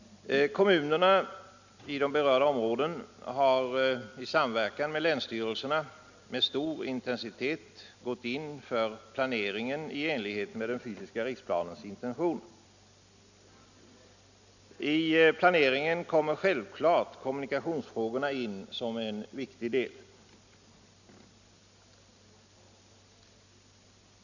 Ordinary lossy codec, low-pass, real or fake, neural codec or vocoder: none; 7.2 kHz; real; none